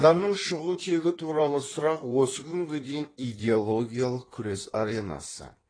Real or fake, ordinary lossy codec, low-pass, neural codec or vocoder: fake; AAC, 32 kbps; 9.9 kHz; codec, 16 kHz in and 24 kHz out, 1.1 kbps, FireRedTTS-2 codec